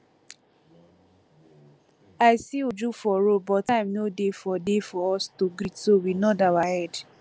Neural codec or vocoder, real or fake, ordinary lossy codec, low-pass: none; real; none; none